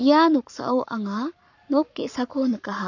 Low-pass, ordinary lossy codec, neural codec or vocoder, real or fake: 7.2 kHz; none; codec, 44.1 kHz, 7.8 kbps, Pupu-Codec; fake